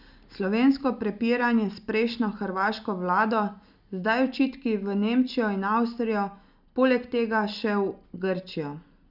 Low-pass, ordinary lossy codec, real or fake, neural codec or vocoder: 5.4 kHz; none; real; none